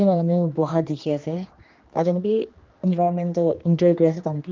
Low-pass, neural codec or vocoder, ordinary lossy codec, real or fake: 7.2 kHz; codec, 16 kHz, 2 kbps, X-Codec, HuBERT features, trained on general audio; Opus, 24 kbps; fake